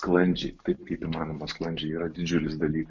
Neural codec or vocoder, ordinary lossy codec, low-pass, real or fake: none; MP3, 48 kbps; 7.2 kHz; real